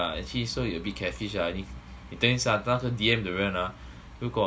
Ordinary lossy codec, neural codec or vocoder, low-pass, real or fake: none; none; none; real